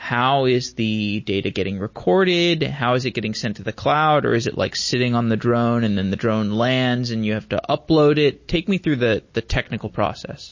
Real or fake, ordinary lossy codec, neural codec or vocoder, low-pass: real; MP3, 32 kbps; none; 7.2 kHz